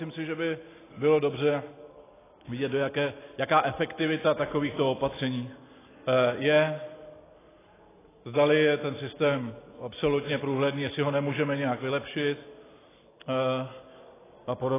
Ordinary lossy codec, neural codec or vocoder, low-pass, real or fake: AAC, 16 kbps; none; 3.6 kHz; real